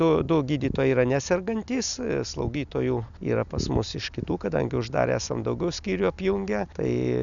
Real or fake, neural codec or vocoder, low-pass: real; none; 7.2 kHz